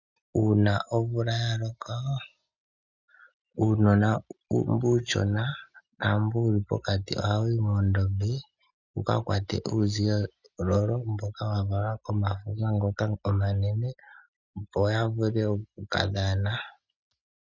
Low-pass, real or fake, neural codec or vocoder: 7.2 kHz; real; none